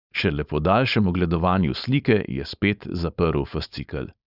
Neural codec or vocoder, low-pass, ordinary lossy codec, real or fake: codec, 16 kHz, 4.8 kbps, FACodec; 5.4 kHz; none; fake